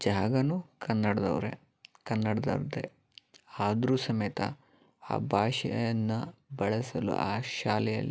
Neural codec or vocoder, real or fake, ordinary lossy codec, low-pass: none; real; none; none